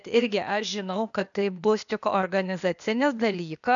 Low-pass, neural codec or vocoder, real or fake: 7.2 kHz; codec, 16 kHz, 0.8 kbps, ZipCodec; fake